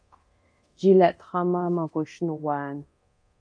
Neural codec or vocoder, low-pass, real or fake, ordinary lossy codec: codec, 24 kHz, 0.9 kbps, DualCodec; 9.9 kHz; fake; MP3, 48 kbps